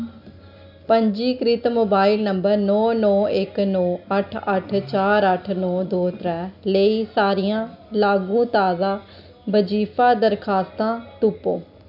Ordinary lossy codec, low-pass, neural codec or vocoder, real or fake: none; 5.4 kHz; none; real